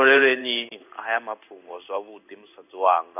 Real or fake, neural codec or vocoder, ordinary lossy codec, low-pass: fake; codec, 16 kHz in and 24 kHz out, 1 kbps, XY-Tokenizer; none; 3.6 kHz